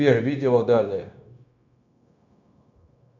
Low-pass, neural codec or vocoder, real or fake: 7.2 kHz; codec, 16 kHz, 0.9 kbps, LongCat-Audio-Codec; fake